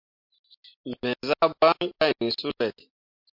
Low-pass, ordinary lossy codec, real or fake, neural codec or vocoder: 5.4 kHz; MP3, 48 kbps; real; none